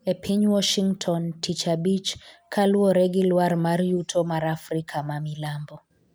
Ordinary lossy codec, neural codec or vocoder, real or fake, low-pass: none; none; real; none